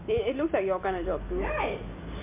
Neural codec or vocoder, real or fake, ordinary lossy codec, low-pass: none; real; MP3, 32 kbps; 3.6 kHz